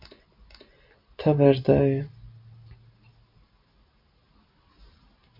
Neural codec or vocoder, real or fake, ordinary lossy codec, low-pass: none; real; MP3, 48 kbps; 5.4 kHz